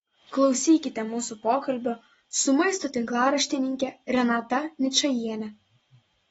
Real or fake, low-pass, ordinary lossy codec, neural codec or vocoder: real; 19.8 kHz; AAC, 24 kbps; none